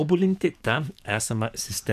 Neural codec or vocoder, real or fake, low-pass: codec, 44.1 kHz, 7.8 kbps, DAC; fake; 14.4 kHz